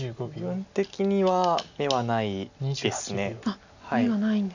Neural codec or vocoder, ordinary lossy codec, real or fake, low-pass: none; none; real; 7.2 kHz